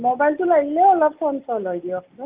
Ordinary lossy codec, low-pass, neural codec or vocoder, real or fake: Opus, 24 kbps; 3.6 kHz; none; real